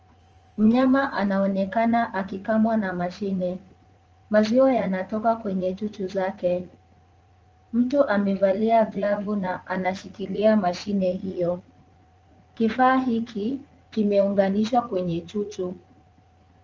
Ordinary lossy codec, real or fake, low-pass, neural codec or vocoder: Opus, 24 kbps; fake; 7.2 kHz; vocoder, 44.1 kHz, 80 mel bands, Vocos